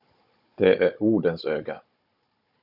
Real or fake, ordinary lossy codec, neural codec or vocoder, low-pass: fake; AAC, 48 kbps; codec, 16 kHz, 16 kbps, FunCodec, trained on Chinese and English, 50 frames a second; 5.4 kHz